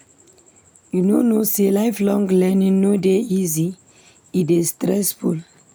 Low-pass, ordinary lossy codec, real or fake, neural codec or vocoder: none; none; real; none